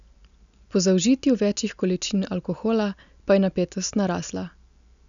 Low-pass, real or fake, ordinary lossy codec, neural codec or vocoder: 7.2 kHz; real; MP3, 96 kbps; none